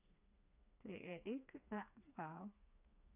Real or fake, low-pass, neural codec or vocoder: fake; 3.6 kHz; codec, 16 kHz, 2 kbps, FreqCodec, smaller model